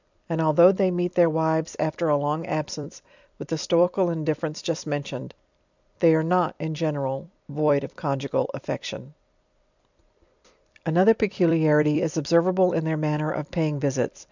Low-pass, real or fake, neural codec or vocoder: 7.2 kHz; fake; vocoder, 44.1 kHz, 128 mel bands every 256 samples, BigVGAN v2